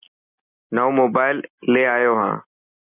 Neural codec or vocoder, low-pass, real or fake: none; 3.6 kHz; real